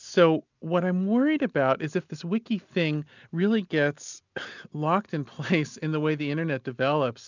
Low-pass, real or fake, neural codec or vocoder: 7.2 kHz; real; none